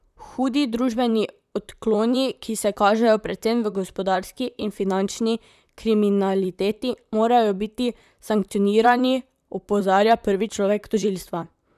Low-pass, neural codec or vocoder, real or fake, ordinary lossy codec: 14.4 kHz; vocoder, 44.1 kHz, 128 mel bands, Pupu-Vocoder; fake; none